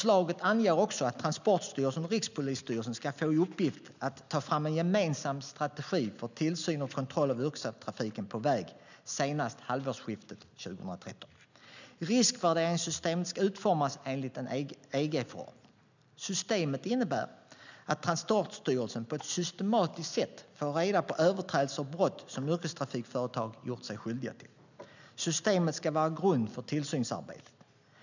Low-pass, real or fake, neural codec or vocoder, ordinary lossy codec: 7.2 kHz; real; none; none